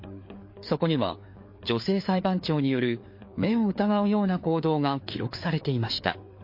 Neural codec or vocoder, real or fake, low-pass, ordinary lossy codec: codec, 16 kHz, 4 kbps, FreqCodec, larger model; fake; 5.4 kHz; MP3, 32 kbps